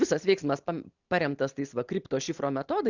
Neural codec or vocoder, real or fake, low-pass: none; real; 7.2 kHz